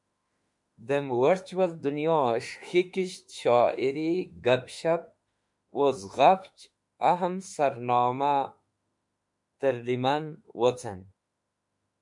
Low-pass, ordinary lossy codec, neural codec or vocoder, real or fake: 10.8 kHz; MP3, 64 kbps; autoencoder, 48 kHz, 32 numbers a frame, DAC-VAE, trained on Japanese speech; fake